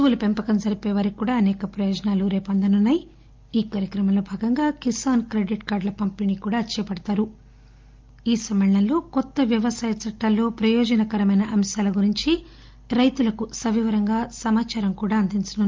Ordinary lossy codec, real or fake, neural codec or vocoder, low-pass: Opus, 32 kbps; real; none; 7.2 kHz